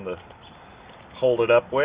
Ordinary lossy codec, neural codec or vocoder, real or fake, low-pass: Opus, 64 kbps; none; real; 3.6 kHz